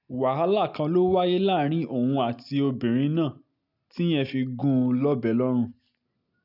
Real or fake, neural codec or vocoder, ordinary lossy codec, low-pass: real; none; none; 5.4 kHz